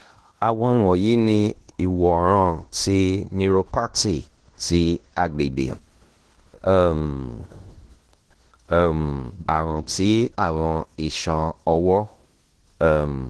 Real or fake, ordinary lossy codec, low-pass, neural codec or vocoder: fake; Opus, 16 kbps; 10.8 kHz; codec, 16 kHz in and 24 kHz out, 0.9 kbps, LongCat-Audio-Codec, fine tuned four codebook decoder